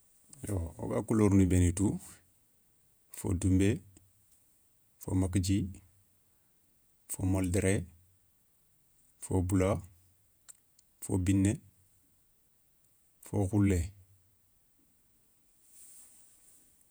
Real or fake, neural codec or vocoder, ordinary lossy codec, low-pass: fake; vocoder, 48 kHz, 128 mel bands, Vocos; none; none